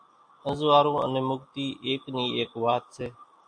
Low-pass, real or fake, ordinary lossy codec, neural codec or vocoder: 9.9 kHz; real; AAC, 64 kbps; none